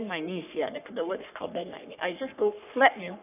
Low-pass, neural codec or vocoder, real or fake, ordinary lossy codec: 3.6 kHz; codec, 44.1 kHz, 3.4 kbps, Pupu-Codec; fake; none